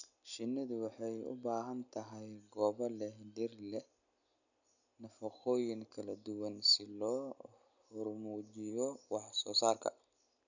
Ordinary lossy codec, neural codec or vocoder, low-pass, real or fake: none; none; 7.2 kHz; real